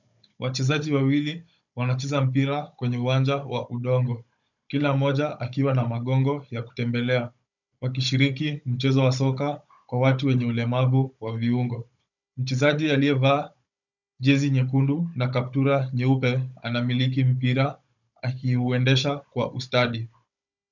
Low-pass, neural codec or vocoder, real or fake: 7.2 kHz; codec, 16 kHz, 16 kbps, FunCodec, trained on Chinese and English, 50 frames a second; fake